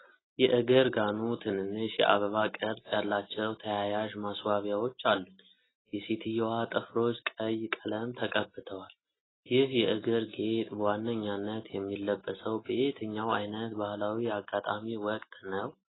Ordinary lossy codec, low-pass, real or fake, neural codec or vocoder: AAC, 16 kbps; 7.2 kHz; real; none